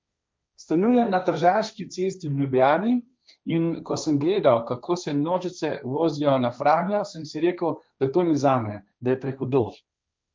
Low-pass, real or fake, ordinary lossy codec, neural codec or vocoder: none; fake; none; codec, 16 kHz, 1.1 kbps, Voila-Tokenizer